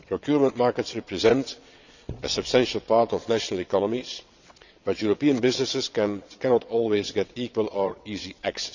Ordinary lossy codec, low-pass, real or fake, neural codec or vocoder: none; 7.2 kHz; fake; vocoder, 22.05 kHz, 80 mel bands, WaveNeXt